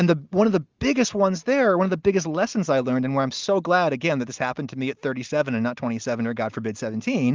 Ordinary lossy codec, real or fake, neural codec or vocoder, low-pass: Opus, 32 kbps; real; none; 7.2 kHz